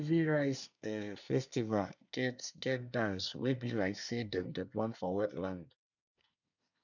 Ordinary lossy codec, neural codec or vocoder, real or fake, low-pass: none; codec, 24 kHz, 1 kbps, SNAC; fake; 7.2 kHz